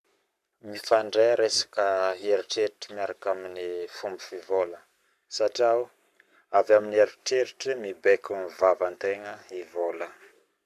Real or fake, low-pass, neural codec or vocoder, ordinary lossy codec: fake; 14.4 kHz; codec, 44.1 kHz, 7.8 kbps, Pupu-Codec; none